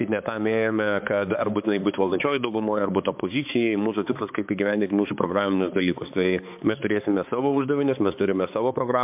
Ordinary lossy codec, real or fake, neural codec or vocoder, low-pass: MP3, 32 kbps; fake; codec, 16 kHz, 4 kbps, X-Codec, HuBERT features, trained on balanced general audio; 3.6 kHz